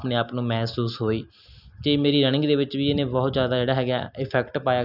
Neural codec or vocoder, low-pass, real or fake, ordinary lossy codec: none; 5.4 kHz; real; none